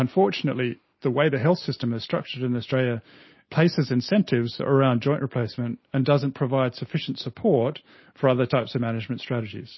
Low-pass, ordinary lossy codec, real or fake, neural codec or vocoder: 7.2 kHz; MP3, 24 kbps; real; none